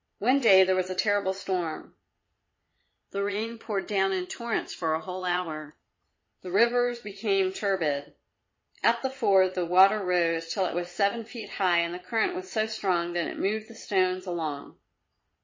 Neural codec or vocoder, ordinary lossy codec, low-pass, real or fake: vocoder, 22.05 kHz, 80 mel bands, Vocos; MP3, 32 kbps; 7.2 kHz; fake